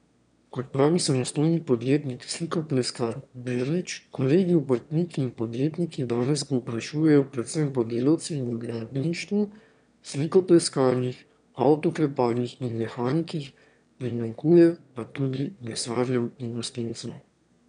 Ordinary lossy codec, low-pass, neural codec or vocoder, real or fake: none; 9.9 kHz; autoencoder, 22.05 kHz, a latent of 192 numbers a frame, VITS, trained on one speaker; fake